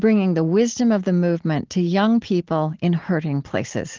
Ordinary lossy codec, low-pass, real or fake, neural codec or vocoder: Opus, 16 kbps; 7.2 kHz; real; none